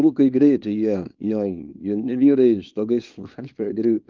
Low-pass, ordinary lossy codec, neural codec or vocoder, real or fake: 7.2 kHz; Opus, 24 kbps; codec, 24 kHz, 0.9 kbps, WavTokenizer, small release; fake